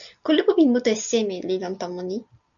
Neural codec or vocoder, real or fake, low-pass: none; real; 7.2 kHz